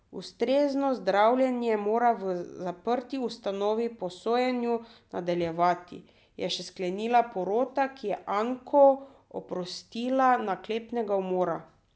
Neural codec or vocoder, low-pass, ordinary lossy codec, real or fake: none; none; none; real